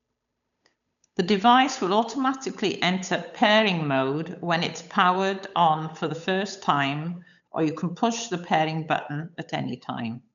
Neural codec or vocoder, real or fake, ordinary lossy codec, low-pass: codec, 16 kHz, 8 kbps, FunCodec, trained on Chinese and English, 25 frames a second; fake; none; 7.2 kHz